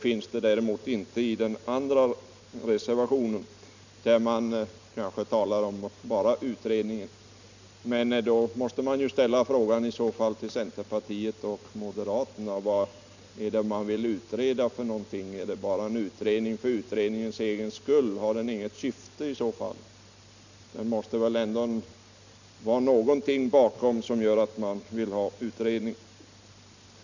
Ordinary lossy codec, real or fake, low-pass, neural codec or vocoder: none; real; 7.2 kHz; none